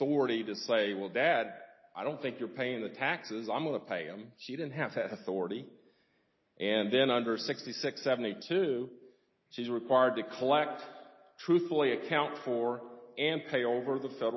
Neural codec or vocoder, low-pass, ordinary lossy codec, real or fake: none; 7.2 kHz; MP3, 24 kbps; real